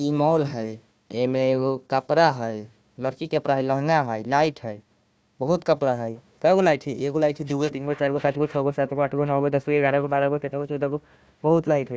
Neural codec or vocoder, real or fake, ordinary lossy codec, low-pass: codec, 16 kHz, 1 kbps, FunCodec, trained on Chinese and English, 50 frames a second; fake; none; none